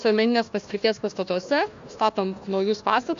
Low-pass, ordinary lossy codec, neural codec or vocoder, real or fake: 7.2 kHz; MP3, 64 kbps; codec, 16 kHz, 1 kbps, FunCodec, trained on Chinese and English, 50 frames a second; fake